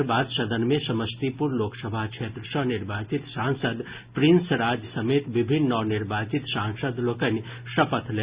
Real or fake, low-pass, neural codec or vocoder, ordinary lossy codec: real; 3.6 kHz; none; Opus, 64 kbps